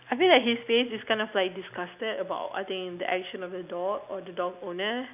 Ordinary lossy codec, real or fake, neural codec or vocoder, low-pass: none; real; none; 3.6 kHz